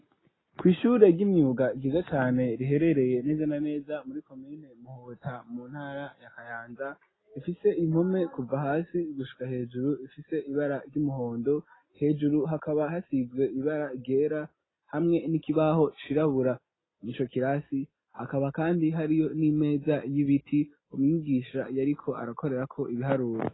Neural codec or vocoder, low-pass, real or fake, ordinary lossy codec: none; 7.2 kHz; real; AAC, 16 kbps